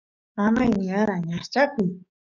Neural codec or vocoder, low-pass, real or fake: codec, 44.1 kHz, 7.8 kbps, DAC; 7.2 kHz; fake